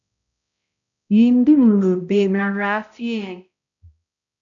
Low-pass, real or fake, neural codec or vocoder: 7.2 kHz; fake; codec, 16 kHz, 0.5 kbps, X-Codec, HuBERT features, trained on balanced general audio